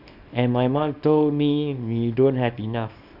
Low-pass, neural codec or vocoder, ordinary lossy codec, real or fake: 5.4 kHz; codec, 24 kHz, 0.9 kbps, WavTokenizer, small release; none; fake